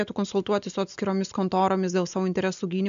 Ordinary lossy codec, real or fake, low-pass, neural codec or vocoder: MP3, 64 kbps; real; 7.2 kHz; none